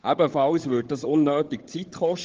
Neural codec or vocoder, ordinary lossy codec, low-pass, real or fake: codec, 16 kHz, 16 kbps, FunCodec, trained on LibriTTS, 50 frames a second; Opus, 16 kbps; 7.2 kHz; fake